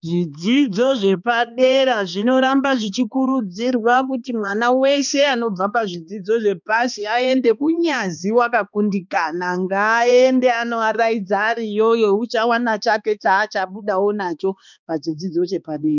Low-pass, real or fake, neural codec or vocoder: 7.2 kHz; fake; codec, 16 kHz, 2 kbps, X-Codec, HuBERT features, trained on balanced general audio